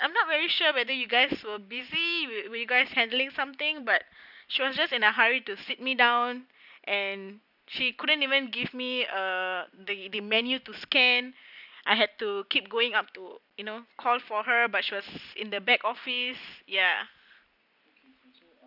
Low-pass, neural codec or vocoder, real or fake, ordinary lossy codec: 5.4 kHz; none; real; none